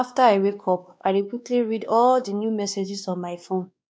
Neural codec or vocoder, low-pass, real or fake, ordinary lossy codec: codec, 16 kHz, 2 kbps, X-Codec, WavLM features, trained on Multilingual LibriSpeech; none; fake; none